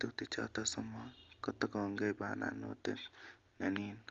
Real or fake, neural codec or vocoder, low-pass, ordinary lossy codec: real; none; 7.2 kHz; Opus, 32 kbps